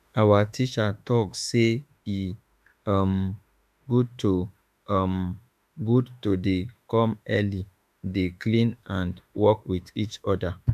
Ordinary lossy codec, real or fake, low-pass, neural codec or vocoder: none; fake; 14.4 kHz; autoencoder, 48 kHz, 32 numbers a frame, DAC-VAE, trained on Japanese speech